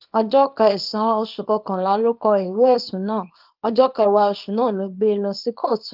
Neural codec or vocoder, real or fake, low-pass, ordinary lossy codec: codec, 24 kHz, 0.9 kbps, WavTokenizer, small release; fake; 5.4 kHz; Opus, 32 kbps